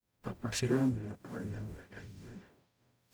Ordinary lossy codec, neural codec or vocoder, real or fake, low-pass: none; codec, 44.1 kHz, 0.9 kbps, DAC; fake; none